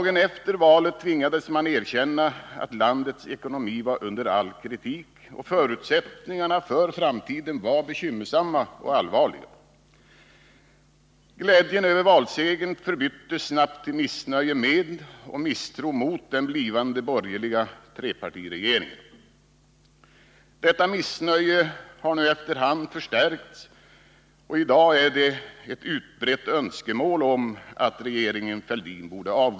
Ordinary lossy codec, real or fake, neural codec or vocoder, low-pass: none; real; none; none